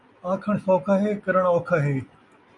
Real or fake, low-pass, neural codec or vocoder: real; 10.8 kHz; none